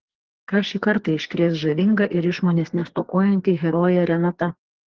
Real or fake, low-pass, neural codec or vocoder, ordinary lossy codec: fake; 7.2 kHz; codec, 44.1 kHz, 2.6 kbps, SNAC; Opus, 16 kbps